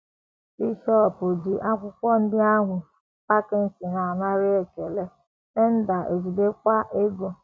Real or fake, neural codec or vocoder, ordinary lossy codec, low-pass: real; none; none; none